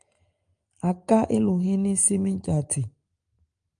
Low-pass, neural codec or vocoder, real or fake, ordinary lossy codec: 9.9 kHz; none; real; Opus, 32 kbps